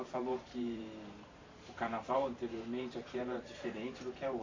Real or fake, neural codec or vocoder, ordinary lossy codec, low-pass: fake; vocoder, 44.1 kHz, 128 mel bands every 512 samples, BigVGAN v2; AAC, 32 kbps; 7.2 kHz